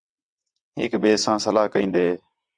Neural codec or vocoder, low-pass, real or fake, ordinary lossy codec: vocoder, 22.05 kHz, 80 mel bands, WaveNeXt; 9.9 kHz; fake; Opus, 64 kbps